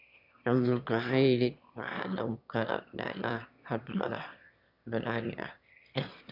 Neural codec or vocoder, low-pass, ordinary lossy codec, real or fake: autoencoder, 22.05 kHz, a latent of 192 numbers a frame, VITS, trained on one speaker; 5.4 kHz; none; fake